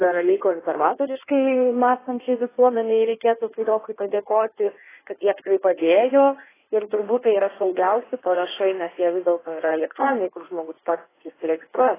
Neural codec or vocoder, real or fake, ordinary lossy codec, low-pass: codec, 16 kHz in and 24 kHz out, 1.1 kbps, FireRedTTS-2 codec; fake; AAC, 16 kbps; 3.6 kHz